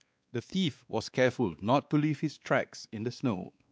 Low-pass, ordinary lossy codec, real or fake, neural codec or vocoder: none; none; fake; codec, 16 kHz, 2 kbps, X-Codec, WavLM features, trained on Multilingual LibriSpeech